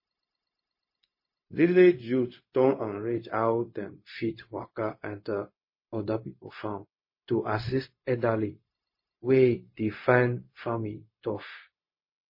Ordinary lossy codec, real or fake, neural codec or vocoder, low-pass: MP3, 24 kbps; fake; codec, 16 kHz, 0.4 kbps, LongCat-Audio-Codec; 5.4 kHz